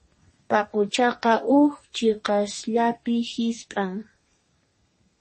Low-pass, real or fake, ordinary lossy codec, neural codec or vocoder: 10.8 kHz; fake; MP3, 32 kbps; codec, 32 kHz, 1.9 kbps, SNAC